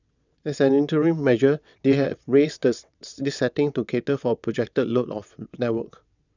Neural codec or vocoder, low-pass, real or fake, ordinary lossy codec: vocoder, 22.05 kHz, 80 mel bands, WaveNeXt; 7.2 kHz; fake; none